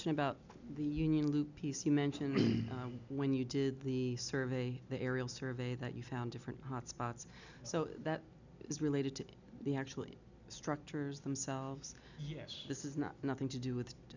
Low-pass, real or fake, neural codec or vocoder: 7.2 kHz; real; none